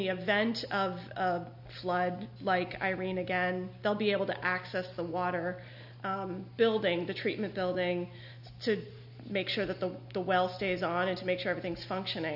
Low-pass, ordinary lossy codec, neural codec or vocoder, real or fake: 5.4 kHz; AAC, 48 kbps; none; real